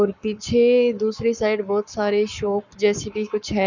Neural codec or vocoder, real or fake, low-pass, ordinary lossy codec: codec, 44.1 kHz, 7.8 kbps, Pupu-Codec; fake; 7.2 kHz; none